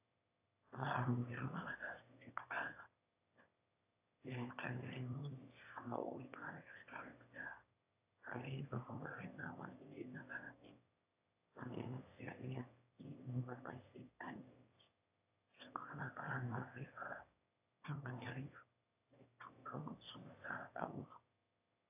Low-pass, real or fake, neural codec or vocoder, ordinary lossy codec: 3.6 kHz; fake; autoencoder, 22.05 kHz, a latent of 192 numbers a frame, VITS, trained on one speaker; AAC, 32 kbps